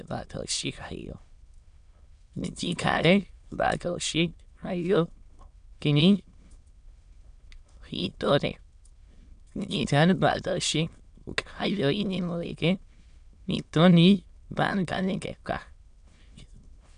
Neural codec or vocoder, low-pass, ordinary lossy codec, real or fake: autoencoder, 22.05 kHz, a latent of 192 numbers a frame, VITS, trained on many speakers; 9.9 kHz; AAC, 96 kbps; fake